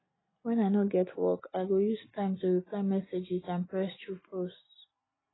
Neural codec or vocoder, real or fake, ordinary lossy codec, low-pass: none; real; AAC, 16 kbps; 7.2 kHz